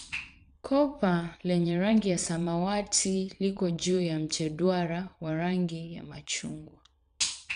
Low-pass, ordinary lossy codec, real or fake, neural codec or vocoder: 9.9 kHz; none; fake; vocoder, 22.05 kHz, 80 mel bands, Vocos